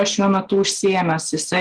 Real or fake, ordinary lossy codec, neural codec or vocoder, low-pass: real; Opus, 16 kbps; none; 9.9 kHz